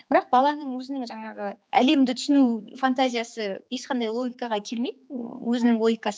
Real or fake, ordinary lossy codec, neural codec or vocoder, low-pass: fake; none; codec, 16 kHz, 4 kbps, X-Codec, HuBERT features, trained on general audio; none